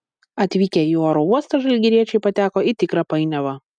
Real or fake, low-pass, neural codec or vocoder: real; 9.9 kHz; none